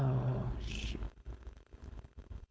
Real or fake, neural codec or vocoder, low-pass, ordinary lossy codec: fake; codec, 16 kHz, 4.8 kbps, FACodec; none; none